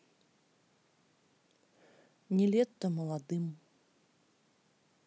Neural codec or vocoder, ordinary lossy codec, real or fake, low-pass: none; none; real; none